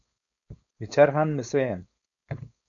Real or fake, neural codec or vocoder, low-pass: fake; codec, 16 kHz, 4.8 kbps, FACodec; 7.2 kHz